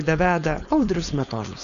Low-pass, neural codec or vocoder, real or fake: 7.2 kHz; codec, 16 kHz, 4.8 kbps, FACodec; fake